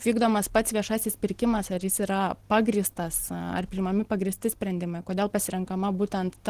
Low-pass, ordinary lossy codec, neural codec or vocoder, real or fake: 14.4 kHz; Opus, 16 kbps; none; real